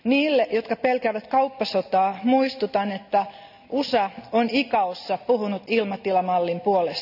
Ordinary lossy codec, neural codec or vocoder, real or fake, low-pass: none; none; real; 5.4 kHz